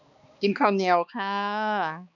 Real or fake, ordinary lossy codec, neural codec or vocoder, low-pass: fake; none; codec, 16 kHz, 2 kbps, X-Codec, HuBERT features, trained on balanced general audio; 7.2 kHz